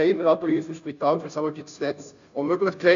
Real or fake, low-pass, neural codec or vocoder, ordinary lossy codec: fake; 7.2 kHz; codec, 16 kHz, 0.5 kbps, FunCodec, trained on Chinese and English, 25 frames a second; AAC, 96 kbps